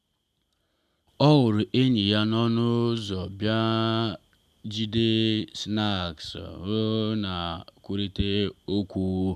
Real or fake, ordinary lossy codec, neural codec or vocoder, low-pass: real; none; none; 14.4 kHz